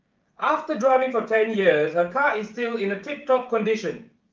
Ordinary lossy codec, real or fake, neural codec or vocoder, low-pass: Opus, 32 kbps; fake; codec, 16 kHz, 16 kbps, FreqCodec, smaller model; 7.2 kHz